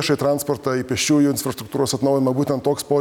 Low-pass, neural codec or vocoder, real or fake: 19.8 kHz; none; real